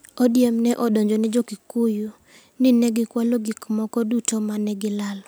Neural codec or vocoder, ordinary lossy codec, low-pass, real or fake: none; none; none; real